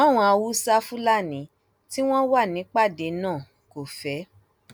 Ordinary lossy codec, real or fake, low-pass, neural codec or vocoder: none; real; none; none